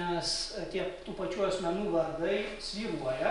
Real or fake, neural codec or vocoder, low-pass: real; none; 10.8 kHz